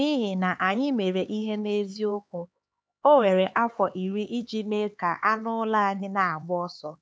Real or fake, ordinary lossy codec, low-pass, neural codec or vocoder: fake; none; none; codec, 16 kHz, 4 kbps, X-Codec, HuBERT features, trained on LibriSpeech